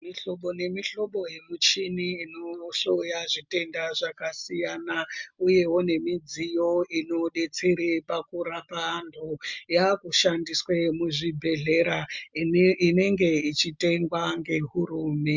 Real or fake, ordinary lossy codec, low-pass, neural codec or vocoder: real; MP3, 64 kbps; 7.2 kHz; none